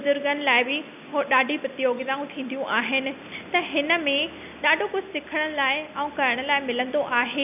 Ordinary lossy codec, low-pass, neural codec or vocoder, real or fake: none; 3.6 kHz; none; real